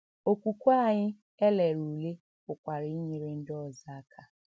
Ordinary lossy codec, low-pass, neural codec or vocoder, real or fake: none; none; none; real